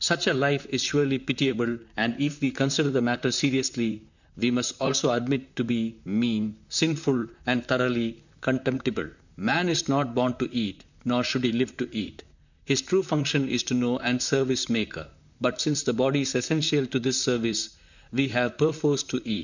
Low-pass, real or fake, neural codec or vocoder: 7.2 kHz; fake; vocoder, 44.1 kHz, 128 mel bands, Pupu-Vocoder